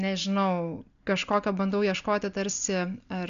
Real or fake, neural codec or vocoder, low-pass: real; none; 7.2 kHz